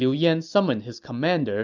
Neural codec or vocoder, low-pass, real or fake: none; 7.2 kHz; real